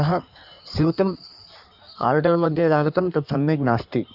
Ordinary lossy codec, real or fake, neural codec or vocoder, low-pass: none; fake; codec, 16 kHz in and 24 kHz out, 1.1 kbps, FireRedTTS-2 codec; 5.4 kHz